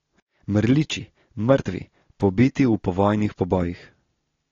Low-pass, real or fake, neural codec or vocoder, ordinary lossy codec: 7.2 kHz; real; none; AAC, 32 kbps